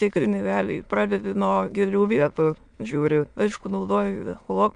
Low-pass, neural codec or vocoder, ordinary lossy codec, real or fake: 9.9 kHz; autoencoder, 22.05 kHz, a latent of 192 numbers a frame, VITS, trained on many speakers; MP3, 64 kbps; fake